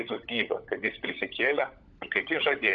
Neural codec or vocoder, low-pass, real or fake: codec, 16 kHz, 16 kbps, FunCodec, trained on LibriTTS, 50 frames a second; 7.2 kHz; fake